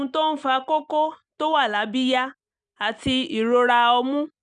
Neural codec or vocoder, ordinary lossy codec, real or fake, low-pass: none; none; real; 9.9 kHz